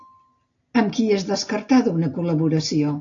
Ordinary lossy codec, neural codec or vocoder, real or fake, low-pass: AAC, 48 kbps; none; real; 7.2 kHz